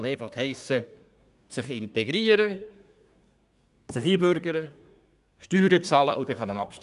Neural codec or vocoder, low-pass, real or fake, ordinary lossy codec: codec, 24 kHz, 1 kbps, SNAC; 10.8 kHz; fake; none